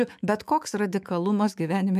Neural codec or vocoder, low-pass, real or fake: autoencoder, 48 kHz, 128 numbers a frame, DAC-VAE, trained on Japanese speech; 14.4 kHz; fake